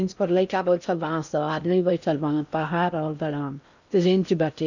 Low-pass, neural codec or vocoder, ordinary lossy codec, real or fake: 7.2 kHz; codec, 16 kHz in and 24 kHz out, 0.6 kbps, FocalCodec, streaming, 4096 codes; none; fake